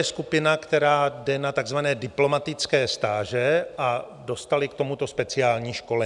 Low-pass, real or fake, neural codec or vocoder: 10.8 kHz; real; none